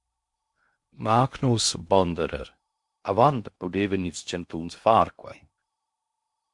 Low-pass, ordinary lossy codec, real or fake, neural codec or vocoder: 10.8 kHz; MP3, 64 kbps; fake; codec, 16 kHz in and 24 kHz out, 0.8 kbps, FocalCodec, streaming, 65536 codes